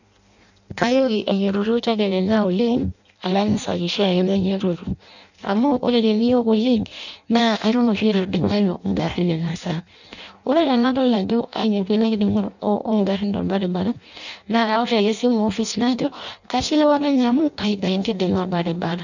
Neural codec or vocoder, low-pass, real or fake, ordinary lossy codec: codec, 16 kHz in and 24 kHz out, 0.6 kbps, FireRedTTS-2 codec; 7.2 kHz; fake; none